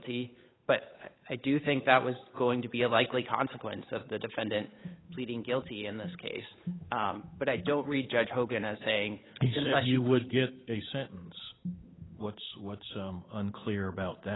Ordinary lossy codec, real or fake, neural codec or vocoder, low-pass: AAC, 16 kbps; fake; codec, 16 kHz, 8 kbps, FunCodec, trained on Chinese and English, 25 frames a second; 7.2 kHz